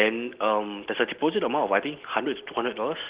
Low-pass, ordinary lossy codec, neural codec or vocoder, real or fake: 3.6 kHz; Opus, 16 kbps; none; real